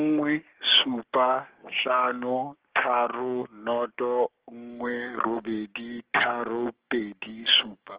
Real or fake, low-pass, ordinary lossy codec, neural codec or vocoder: fake; 3.6 kHz; Opus, 16 kbps; codec, 16 kHz, 6 kbps, DAC